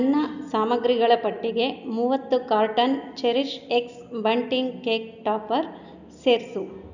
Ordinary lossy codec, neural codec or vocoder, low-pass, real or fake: none; none; 7.2 kHz; real